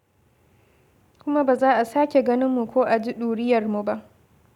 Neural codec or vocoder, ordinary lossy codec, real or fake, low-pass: none; none; real; 19.8 kHz